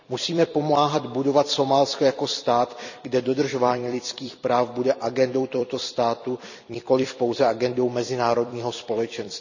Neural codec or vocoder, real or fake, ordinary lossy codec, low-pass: vocoder, 44.1 kHz, 128 mel bands every 256 samples, BigVGAN v2; fake; none; 7.2 kHz